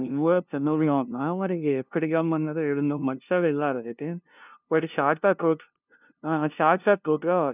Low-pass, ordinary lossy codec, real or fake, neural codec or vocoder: 3.6 kHz; none; fake; codec, 16 kHz, 0.5 kbps, FunCodec, trained on LibriTTS, 25 frames a second